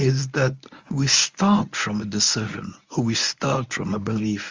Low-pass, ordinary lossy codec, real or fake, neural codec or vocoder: 7.2 kHz; Opus, 32 kbps; fake; codec, 24 kHz, 0.9 kbps, WavTokenizer, medium speech release version 1